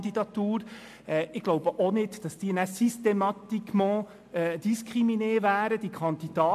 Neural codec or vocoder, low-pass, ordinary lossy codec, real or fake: none; 14.4 kHz; MP3, 64 kbps; real